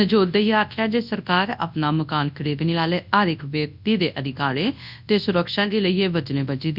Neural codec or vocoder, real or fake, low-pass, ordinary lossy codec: codec, 24 kHz, 0.9 kbps, WavTokenizer, large speech release; fake; 5.4 kHz; none